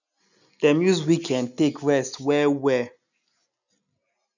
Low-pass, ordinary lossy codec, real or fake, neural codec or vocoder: 7.2 kHz; none; real; none